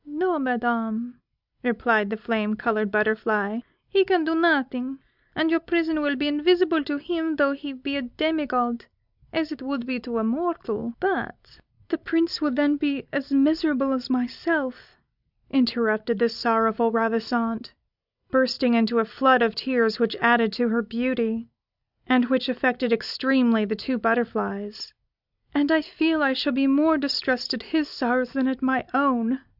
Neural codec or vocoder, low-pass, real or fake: none; 5.4 kHz; real